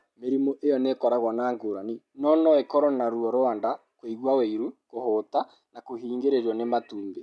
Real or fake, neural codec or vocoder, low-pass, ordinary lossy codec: real; none; none; none